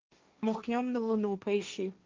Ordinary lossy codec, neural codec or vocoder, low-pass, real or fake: Opus, 24 kbps; codec, 16 kHz, 1.1 kbps, Voila-Tokenizer; 7.2 kHz; fake